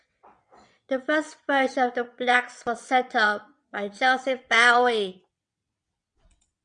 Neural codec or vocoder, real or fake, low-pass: vocoder, 22.05 kHz, 80 mel bands, WaveNeXt; fake; 9.9 kHz